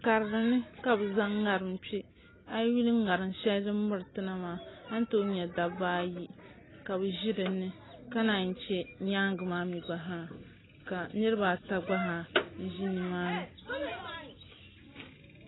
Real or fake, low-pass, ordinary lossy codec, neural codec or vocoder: real; 7.2 kHz; AAC, 16 kbps; none